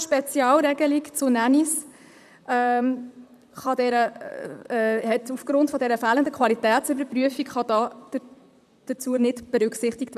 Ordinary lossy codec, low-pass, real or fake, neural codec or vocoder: none; 14.4 kHz; fake; vocoder, 44.1 kHz, 128 mel bands, Pupu-Vocoder